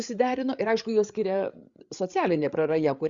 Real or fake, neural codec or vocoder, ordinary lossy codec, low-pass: fake; codec, 16 kHz, 8 kbps, FreqCodec, larger model; Opus, 64 kbps; 7.2 kHz